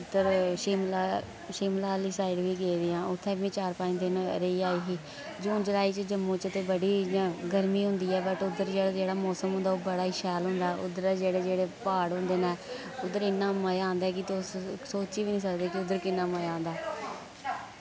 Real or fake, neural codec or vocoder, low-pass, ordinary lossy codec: real; none; none; none